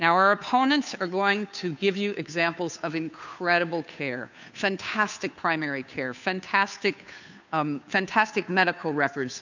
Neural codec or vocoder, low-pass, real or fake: codec, 16 kHz, 2 kbps, FunCodec, trained on Chinese and English, 25 frames a second; 7.2 kHz; fake